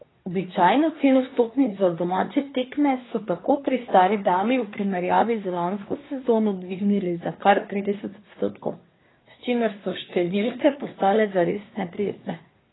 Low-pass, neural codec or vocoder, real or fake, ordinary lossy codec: 7.2 kHz; codec, 24 kHz, 1 kbps, SNAC; fake; AAC, 16 kbps